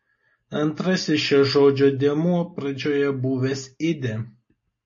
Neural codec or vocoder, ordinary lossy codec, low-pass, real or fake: none; MP3, 32 kbps; 7.2 kHz; real